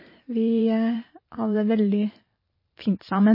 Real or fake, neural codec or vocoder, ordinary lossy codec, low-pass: fake; vocoder, 44.1 kHz, 128 mel bands every 512 samples, BigVGAN v2; MP3, 24 kbps; 5.4 kHz